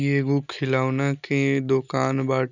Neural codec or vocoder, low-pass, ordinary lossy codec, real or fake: none; 7.2 kHz; none; real